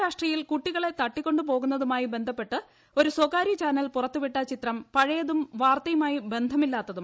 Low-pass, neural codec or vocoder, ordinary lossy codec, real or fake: none; none; none; real